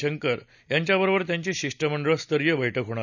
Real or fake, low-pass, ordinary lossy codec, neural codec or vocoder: real; 7.2 kHz; none; none